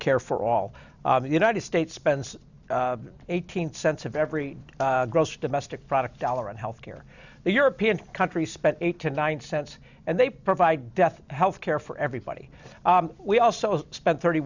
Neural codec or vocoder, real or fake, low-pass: none; real; 7.2 kHz